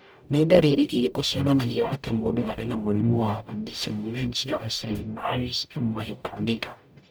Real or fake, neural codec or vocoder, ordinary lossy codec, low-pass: fake; codec, 44.1 kHz, 0.9 kbps, DAC; none; none